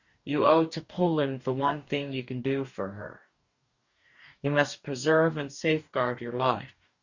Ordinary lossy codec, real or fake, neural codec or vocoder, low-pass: Opus, 64 kbps; fake; codec, 44.1 kHz, 2.6 kbps, DAC; 7.2 kHz